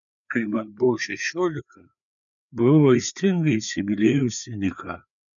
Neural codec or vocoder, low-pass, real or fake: codec, 16 kHz, 4 kbps, FreqCodec, larger model; 7.2 kHz; fake